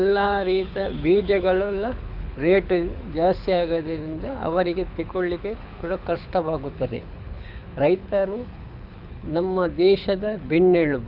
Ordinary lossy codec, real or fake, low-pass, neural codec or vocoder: none; fake; 5.4 kHz; codec, 24 kHz, 6 kbps, HILCodec